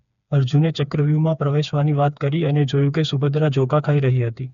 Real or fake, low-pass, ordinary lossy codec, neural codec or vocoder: fake; 7.2 kHz; none; codec, 16 kHz, 4 kbps, FreqCodec, smaller model